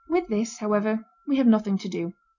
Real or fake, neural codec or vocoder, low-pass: real; none; 7.2 kHz